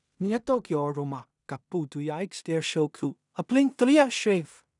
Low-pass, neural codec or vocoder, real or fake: 10.8 kHz; codec, 16 kHz in and 24 kHz out, 0.4 kbps, LongCat-Audio-Codec, two codebook decoder; fake